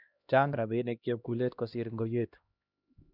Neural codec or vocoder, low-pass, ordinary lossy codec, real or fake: codec, 16 kHz, 1 kbps, X-Codec, HuBERT features, trained on LibriSpeech; 5.4 kHz; none; fake